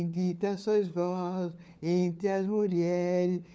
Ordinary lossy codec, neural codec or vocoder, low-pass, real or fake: none; codec, 16 kHz, 4 kbps, FunCodec, trained on LibriTTS, 50 frames a second; none; fake